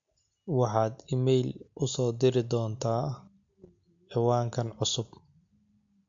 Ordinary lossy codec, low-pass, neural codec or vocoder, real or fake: MP3, 48 kbps; 7.2 kHz; none; real